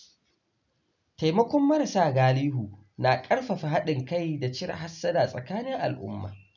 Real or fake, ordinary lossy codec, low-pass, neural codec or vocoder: real; none; 7.2 kHz; none